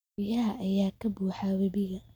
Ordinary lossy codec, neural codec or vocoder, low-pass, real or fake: none; none; none; real